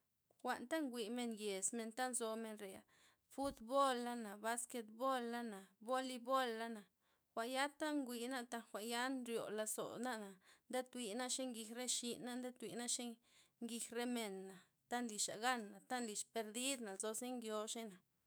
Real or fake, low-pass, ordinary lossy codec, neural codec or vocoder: fake; none; none; autoencoder, 48 kHz, 128 numbers a frame, DAC-VAE, trained on Japanese speech